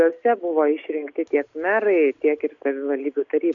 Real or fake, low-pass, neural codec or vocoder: real; 7.2 kHz; none